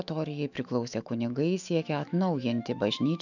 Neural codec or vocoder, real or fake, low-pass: autoencoder, 48 kHz, 128 numbers a frame, DAC-VAE, trained on Japanese speech; fake; 7.2 kHz